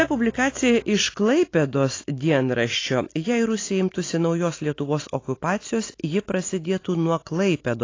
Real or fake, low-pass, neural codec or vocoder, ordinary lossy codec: real; 7.2 kHz; none; AAC, 32 kbps